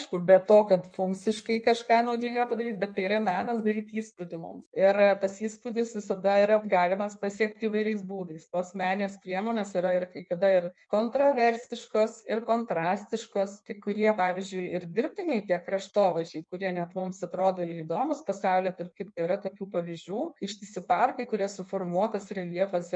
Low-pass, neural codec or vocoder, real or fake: 9.9 kHz; codec, 16 kHz in and 24 kHz out, 1.1 kbps, FireRedTTS-2 codec; fake